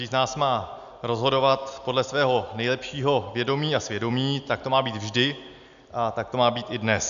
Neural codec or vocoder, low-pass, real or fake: none; 7.2 kHz; real